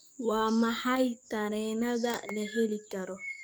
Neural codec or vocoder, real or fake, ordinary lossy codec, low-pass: codec, 44.1 kHz, 7.8 kbps, DAC; fake; none; none